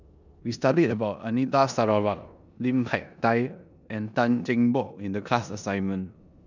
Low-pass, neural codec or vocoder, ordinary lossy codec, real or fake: 7.2 kHz; codec, 16 kHz in and 24 kHz out, 0.9 kbps, LongCat-Audio-Codec, four codebook decoder; none; fake